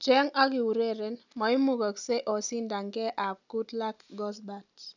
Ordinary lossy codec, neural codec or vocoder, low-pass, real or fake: none; none; 7.2 kHz; real